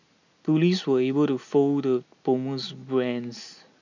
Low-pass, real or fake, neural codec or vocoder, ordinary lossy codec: 7.2 kHz; real; none; none